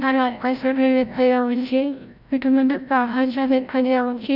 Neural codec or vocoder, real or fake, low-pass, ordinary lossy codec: codec, 16 kHz, 0.5 kbps, FreqCodec, larger model; fake; 5.4 kHz; none